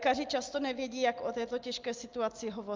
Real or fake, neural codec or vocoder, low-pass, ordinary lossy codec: real; none; 7.2 kHz; Opus, 24 kbps